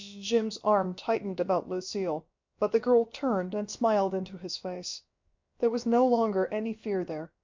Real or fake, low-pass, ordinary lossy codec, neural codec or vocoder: fake; 7.2 kHz; MP3, 48 kbps; codec, 16 kHz, about 1 kbps, DyCAST, with the encoder's durations